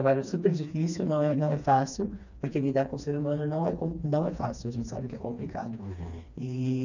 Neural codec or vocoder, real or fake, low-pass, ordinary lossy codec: codec, 16 kHz, 2 kbps, FreqCodec, smaller model; fake; 7.2 kHz; none